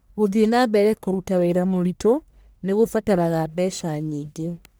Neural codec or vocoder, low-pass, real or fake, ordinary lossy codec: codec, 44.1 kHz, 1.7 kbps, Pupu-Codec; none; fake; none